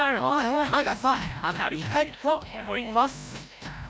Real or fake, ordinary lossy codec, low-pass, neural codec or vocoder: fake; none; none; codec, 16 kHz, 0.5 kbps, FreqCodec, larger model